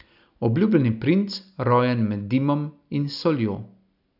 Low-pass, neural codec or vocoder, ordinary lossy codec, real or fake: 5.4 kHz; none; none; real